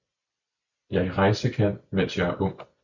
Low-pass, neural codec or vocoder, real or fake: 7.2 kHz; vocoder, 44.1 kHz, 128 mel bands every 256 samples, BigVGAN v2; fake